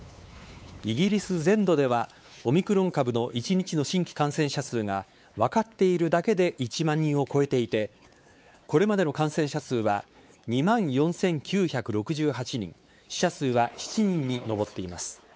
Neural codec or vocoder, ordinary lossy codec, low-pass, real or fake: codec, 16 kHz, 4 kbps, X-Codec, WavLM features, trained on Multilingual LibriSpeech; none; none; fake